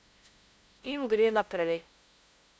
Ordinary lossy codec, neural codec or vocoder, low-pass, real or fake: none; codec, 16 kHz, 0.5 kbps, FunCodec, trained on LibriTTS, 25 frames a second; none; fake